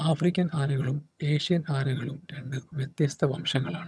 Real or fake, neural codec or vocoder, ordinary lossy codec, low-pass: fake; vocoder, 22.05 kHz, 80 mel bands, HiFi-GAN; none; none